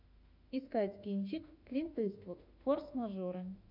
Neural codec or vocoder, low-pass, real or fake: autoencoder, 48 kHz, 32 numbers a frame, DAC-VAE, trained on Japanese speech; 5.4 kHz; fake